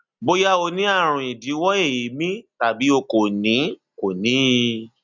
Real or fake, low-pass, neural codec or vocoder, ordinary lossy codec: real; 7.2 kHz; none; none